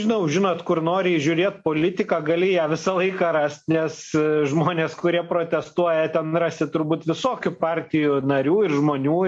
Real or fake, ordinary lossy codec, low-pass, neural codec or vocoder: real; MP3, 48 kbps; 10.8 kHz; none